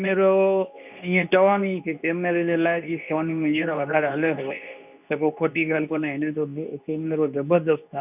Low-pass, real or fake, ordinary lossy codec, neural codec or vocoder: 3.6 kHz; fake; none; codec, 24 kHz, 0.9 kbps, WavTokenizer, medium speech release version 2